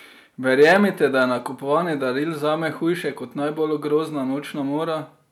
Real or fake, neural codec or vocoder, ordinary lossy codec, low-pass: real; none; none; 19.8 kHz